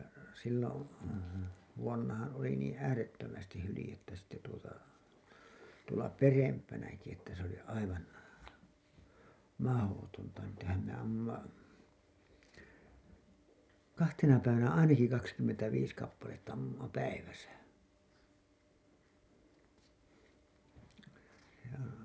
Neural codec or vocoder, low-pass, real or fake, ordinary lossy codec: none; none; real; none